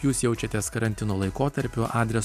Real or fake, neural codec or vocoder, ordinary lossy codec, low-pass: real; none; AAC, 96 kbps; 14.4 kHz